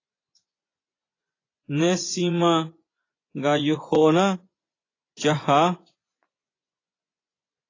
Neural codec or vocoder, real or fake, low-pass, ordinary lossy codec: vocoder, 24 kHz, 100 mel bands, Vocos; fake; 7.2 kHz; AAC, 32 kbps